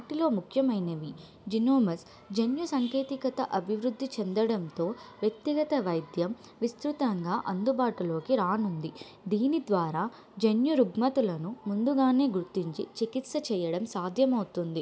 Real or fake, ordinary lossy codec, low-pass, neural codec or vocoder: real; none; none; none